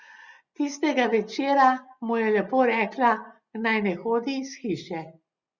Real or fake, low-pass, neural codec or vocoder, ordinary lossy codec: real; 7.2 kHz; none; Opus, 64 kbps